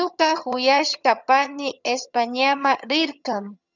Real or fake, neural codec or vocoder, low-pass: fake; vocoder, 22.05 kHz, 80 mel bands, HiFi-GAN; 7.2 kHz